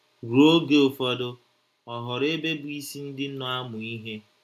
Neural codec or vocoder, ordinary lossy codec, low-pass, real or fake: none; AAC, 96 kbps; 14.4 kHz; real